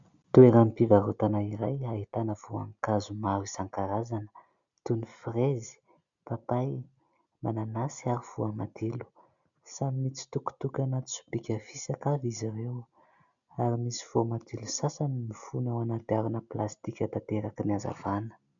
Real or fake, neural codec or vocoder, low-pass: real; none; 7.2 kHz